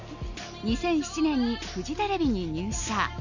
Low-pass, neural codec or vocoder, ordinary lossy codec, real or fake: 7.2 kHz; none; none; real